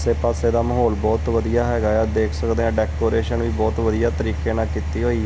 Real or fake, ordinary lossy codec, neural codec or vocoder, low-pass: real; none; none; none